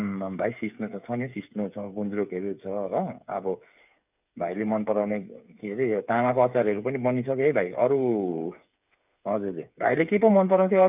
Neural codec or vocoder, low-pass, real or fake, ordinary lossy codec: codec, 16 kHz, 8 kbps, FreqCodec, smaller model; 3.6 kHz; fake; none